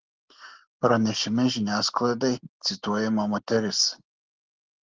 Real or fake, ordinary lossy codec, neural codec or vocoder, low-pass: real; Opus, 16 kbps; none; 7.2 kHz